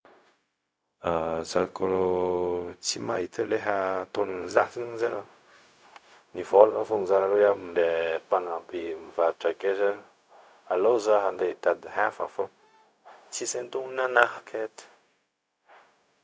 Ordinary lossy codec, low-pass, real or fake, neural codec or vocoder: none; none; fake; codec, 16 kHz, 0.4 kbps, LongCat-Audio-Codec